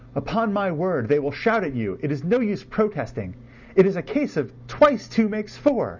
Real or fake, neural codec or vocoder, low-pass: real; none; 7.2 kHz